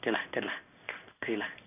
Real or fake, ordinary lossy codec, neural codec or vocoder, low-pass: fake; none; codec, 16 kHz in and 24 kHz out, 1 kbps, XY-Tokenizer; 3.6 kHz